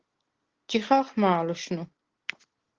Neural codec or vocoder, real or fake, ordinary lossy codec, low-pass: none; real; Opus, 16 kbps; 7.2 kHz